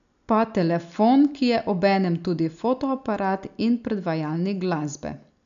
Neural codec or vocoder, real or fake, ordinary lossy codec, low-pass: none; real; none; 7.2 kHz